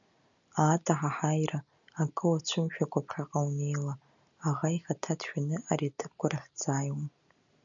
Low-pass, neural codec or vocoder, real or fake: 7.2 kHz; none; real